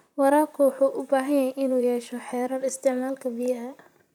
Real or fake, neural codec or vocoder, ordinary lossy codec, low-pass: fake; vocoder, 44.1 kHz, 128 mel bands, Pupu-Vocoder; none; 19.8 kHz